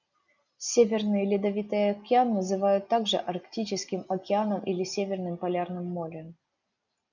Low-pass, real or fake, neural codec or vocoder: 7.2 kHz; real; none